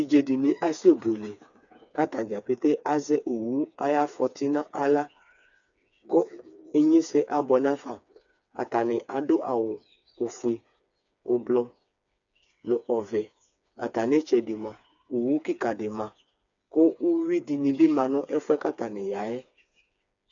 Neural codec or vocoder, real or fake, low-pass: codec, 16 kHz, 4 kbps, FreqCodec, smaller model; fake; 7.2 kHz